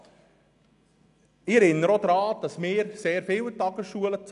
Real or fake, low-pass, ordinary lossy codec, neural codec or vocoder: real; 10.8 kHz; none; none